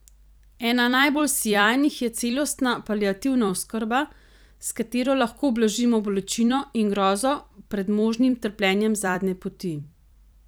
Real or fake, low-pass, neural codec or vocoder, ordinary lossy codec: fake; none; vocoder, 44.1 kHz, 128 mel bands every 256 samples, BigVGAN v2; none